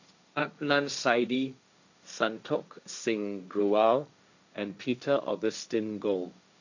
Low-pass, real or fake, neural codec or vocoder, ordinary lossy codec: 7.2 kHz; fake; codec, 16 kHz, 1.1 kbps, Voila-Tokenizer; none